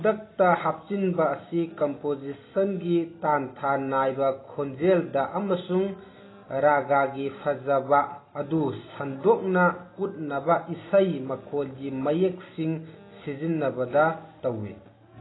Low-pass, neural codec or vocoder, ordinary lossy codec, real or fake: 7.2 kHz; none; AAC, 16 kbps; real